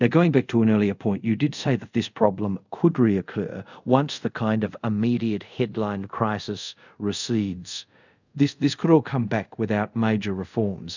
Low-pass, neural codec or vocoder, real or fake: 7.2 kHz; codec, 24 kHz, 0.5 kbps, DualCodec; fake